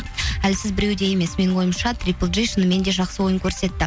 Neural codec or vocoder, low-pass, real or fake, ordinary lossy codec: none; none; real; none